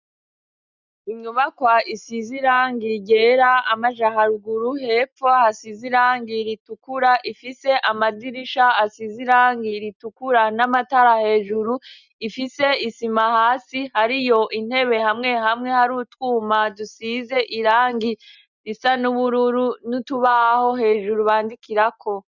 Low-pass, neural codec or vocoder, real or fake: 7.2 kHz; none; real